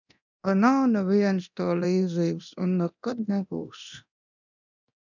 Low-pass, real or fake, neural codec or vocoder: 7.2 kHz; fake; codec, 24 kHz, 0.9 kbps, DualCodec